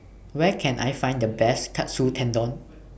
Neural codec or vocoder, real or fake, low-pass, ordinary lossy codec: none; real; none; none